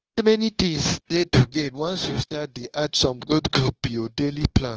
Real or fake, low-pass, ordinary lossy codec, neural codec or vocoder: fake; 7.2 kHz; Opus, 16 kbps; codec, 16 kHz, 0.9 kbps, LongCat-Audio-Codec